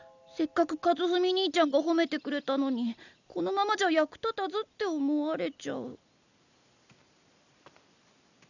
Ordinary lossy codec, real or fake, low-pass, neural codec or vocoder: none; real; 7.2 kHz; none